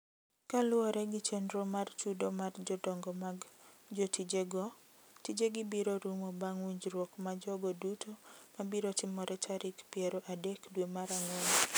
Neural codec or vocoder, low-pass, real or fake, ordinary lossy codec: none; none; real; none